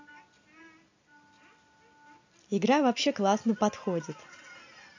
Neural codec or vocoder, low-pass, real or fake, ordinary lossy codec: none; 7.2 kHz; real; AAC, 48 kbps